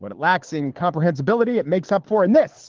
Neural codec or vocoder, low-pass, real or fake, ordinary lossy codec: codec, 24 kHz, 6 kbps, HILCodec; 7.2 kHz; fake; Opus, 16 kbps